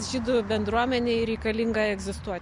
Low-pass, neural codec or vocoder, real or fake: 10.8 kHz; none; real